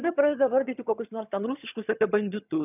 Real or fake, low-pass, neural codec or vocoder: fake; 3.6 kHz; vocoder, 22.05 kHz, 80 mel bands, HiFi-GAN